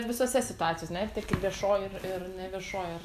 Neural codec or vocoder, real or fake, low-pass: none; real; 14.4 kHz